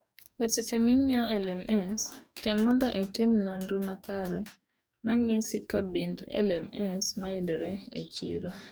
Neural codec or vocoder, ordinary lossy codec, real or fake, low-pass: codec, 44.1 kHz, 2.6 kbps, DAC; none; fake; none